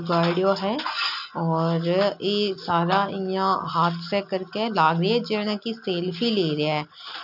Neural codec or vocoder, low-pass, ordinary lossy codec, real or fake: none; 5.4 kHz; none; real